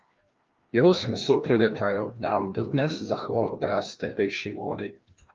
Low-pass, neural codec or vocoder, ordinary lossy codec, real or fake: 7.2 kHz; codec, 16 kHz, 1 kbps, FreqCodec, larger model; Opus, 32 kbps; fake